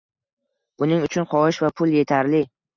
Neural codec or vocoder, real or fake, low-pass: none; real; 7.2 kHz